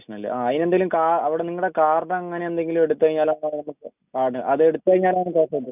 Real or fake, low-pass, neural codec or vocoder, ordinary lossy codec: real; 3.6 kHz; none; none